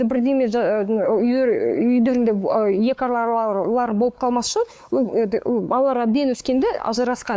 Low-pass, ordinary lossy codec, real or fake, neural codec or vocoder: none; none; fake; codec, 16 kHz, 4 kbps, X-Codec, WavLM features, trained on Multilingual LibriSpeech